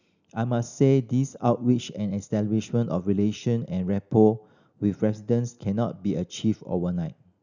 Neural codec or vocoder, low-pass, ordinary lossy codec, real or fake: none; 7.2 kHz; none; real